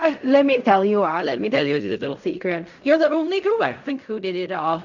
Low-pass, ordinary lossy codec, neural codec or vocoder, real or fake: 7.2 kHz; none; codec, 16 kHz in and 24 kHz out, 0.4 kbps, LongCat-Audio-Codec, fine tuned four codebook decoder; fake